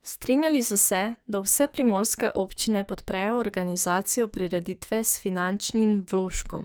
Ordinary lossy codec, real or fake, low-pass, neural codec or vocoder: none; fake; none; codec, 44.1 kHz, 2.6 kbps, SNAC